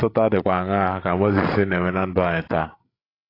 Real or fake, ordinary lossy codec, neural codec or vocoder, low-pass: fake; AAC, 24 kbps; codec, 16 kHz, 16 kbps, FreqCodec, larger model; 5.4 kHz